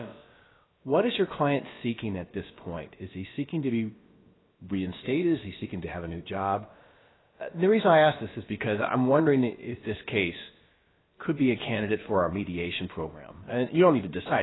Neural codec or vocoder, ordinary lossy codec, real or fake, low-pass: codec, 16 kHz, about 1 kbps, DyCAST, with the encoder's durations; AAC, 16 kbps; fake; 7.2 kHz